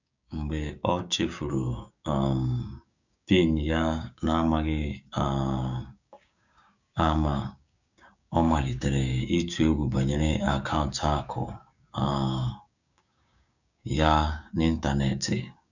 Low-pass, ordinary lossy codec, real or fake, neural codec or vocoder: 7.2 kHz; none; fake; codec, 16 kHz, 6 kbps, DAC